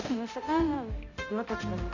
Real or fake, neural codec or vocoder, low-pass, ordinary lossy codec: fake; codec, 16 kHz, 0.5 kbps, X-Codec, HuBERT features, trained on balanced general audio; 7.2 kHz; none